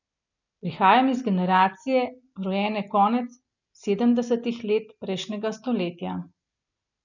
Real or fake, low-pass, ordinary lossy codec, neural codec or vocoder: real; 7.2 kHz; none; none